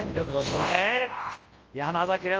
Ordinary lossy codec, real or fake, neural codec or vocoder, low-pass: Opus, 24 kbps; fake; codec, 24 kHz, 0.9 kbps, WavTokenizer, large speech release; 7.2 kHz